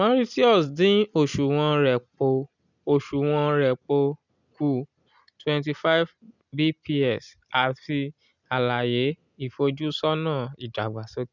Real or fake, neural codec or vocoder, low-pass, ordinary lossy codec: real; none; 7.2 kHz; none